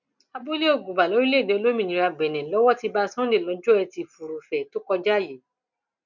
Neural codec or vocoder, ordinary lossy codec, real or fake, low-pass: none; none; real; 7.2 kHz